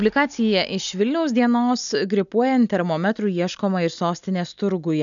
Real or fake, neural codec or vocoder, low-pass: real; none; 7.2 kHz